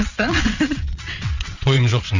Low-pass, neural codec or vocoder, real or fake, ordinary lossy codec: 7.2 kHz; vocoder, 22.05 kHz, 80 mel bands, WaveNeXt; fake; Opus, 64 kbps